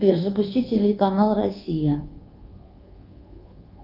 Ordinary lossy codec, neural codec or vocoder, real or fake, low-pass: Opus, 32 kbps; codec, 24 kHz, 1.2 kbps, DualCodec; fake; 5.4 kHz